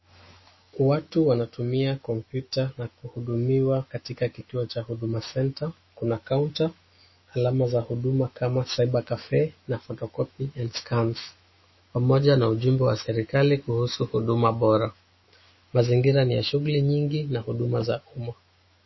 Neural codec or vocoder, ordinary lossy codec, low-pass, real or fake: autoencoder, 48 kHz, 128 numbers a frame, DAC-VAE, trained on Japanese speech; MP3, 24 kbps; 7.2 kHz; fake